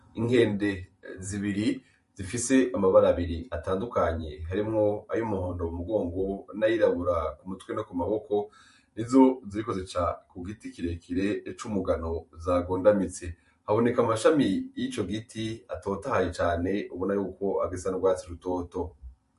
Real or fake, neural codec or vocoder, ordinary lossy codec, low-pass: fake; vocoder, 44.1 kHz, 128 mel bands every 512 samples, BigVGAN v2; MP3, 48 kbps; 14.4 kHz